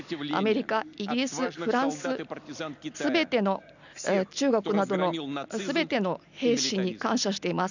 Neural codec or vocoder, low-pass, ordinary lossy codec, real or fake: none; 7.2 kHz; none; real